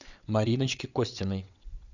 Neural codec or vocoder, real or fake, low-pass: vocoder, 22.05 kHz, 80 mel bands, WaveNeXt; fake; 7.2 kHz